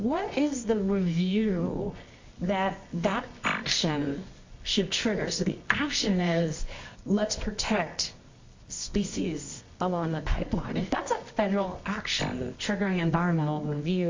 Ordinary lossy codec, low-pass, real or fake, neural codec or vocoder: MP3, 48 kbps; 7.2 kHz; fake; codec, 24 kHz, 0.9 kbps, WavTokenizer, medium music audio release